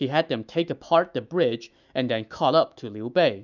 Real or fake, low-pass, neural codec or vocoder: fake; 7.2 kHz; autoencoder, 48 kHz, 128 numbers a frame, DAC-VAE, trained on Japanese speech